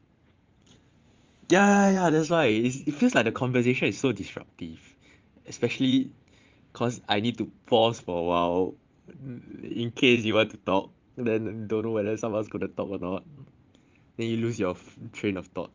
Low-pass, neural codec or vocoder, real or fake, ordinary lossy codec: 7.2 kHz; vocoder, 44.1 kHz, 80 mel bands, Vocos; fake; Opus, 32 kbps